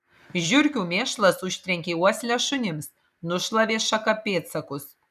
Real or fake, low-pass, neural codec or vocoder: real; 14.4 kHz; none